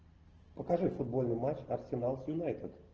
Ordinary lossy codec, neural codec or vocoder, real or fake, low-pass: Opus, 16 kbps; none; real; 7.2 kHz